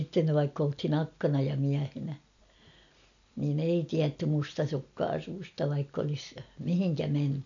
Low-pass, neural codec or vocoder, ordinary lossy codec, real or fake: 7.2 kHz; none; none; real